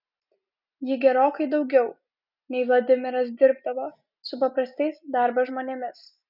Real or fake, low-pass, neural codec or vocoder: real; 5.4 kHz; none